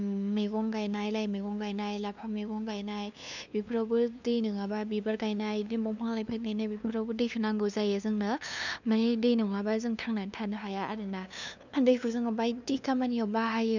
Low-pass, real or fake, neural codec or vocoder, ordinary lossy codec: 7.2 kHz; fake; codec, 16 kHz, 2 kbps, FunCodec, trained on LibriTTS, 25 frames a second; none